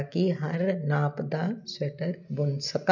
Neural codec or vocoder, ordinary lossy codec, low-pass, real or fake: none; none; 7.2 kHz; real